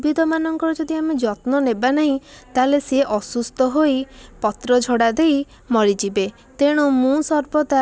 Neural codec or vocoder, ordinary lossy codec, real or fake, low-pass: none; none; real; none